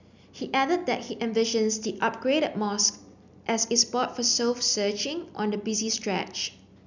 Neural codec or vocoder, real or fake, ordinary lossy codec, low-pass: none; real; none; 7.2 kHz